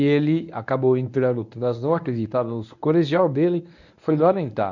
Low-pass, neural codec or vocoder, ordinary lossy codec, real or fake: 7.2 kHz; codec, 24 kHz, 0.9 kbps, WavTokenizer, medium speech release version 1; none; fake